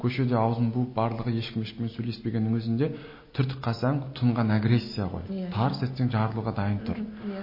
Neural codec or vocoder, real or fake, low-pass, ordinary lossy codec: none; real; 5.4 kHz; MP3, 24 kbps